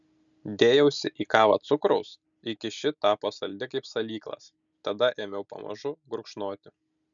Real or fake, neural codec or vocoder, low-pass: real; none; 7.2 kHz